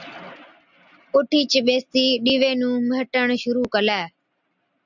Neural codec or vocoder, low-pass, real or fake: none; 7.2 kHz; real